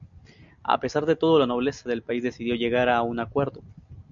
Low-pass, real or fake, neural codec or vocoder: 7.2 kHz; real; none